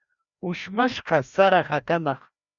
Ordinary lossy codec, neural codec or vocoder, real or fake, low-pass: Opus, 64 kbps; codec, 16 kHz, 1 kbps, FreqCodec, larger model; fake; 7.2 kHz